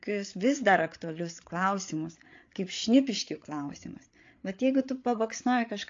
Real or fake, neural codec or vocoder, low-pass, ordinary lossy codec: fake; codec, 16 kHz, 8 kbps, FreqCodec, larger model; 7.2 kHz; AAC, 48 kbps